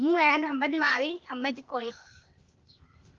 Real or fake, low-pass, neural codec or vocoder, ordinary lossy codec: fake; 7.2 kHz; codec, 16 kHz, 0.8 kbps, ZipCodec; Opus, 24 kbps